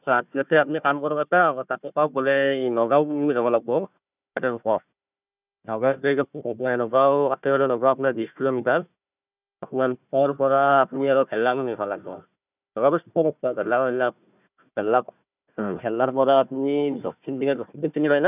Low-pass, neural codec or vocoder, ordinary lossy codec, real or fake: 3.6 kHz; codec, 16 kHz, 1 kbps, FunCodec, trained on Chinese and English, 50 frames a second; none; fake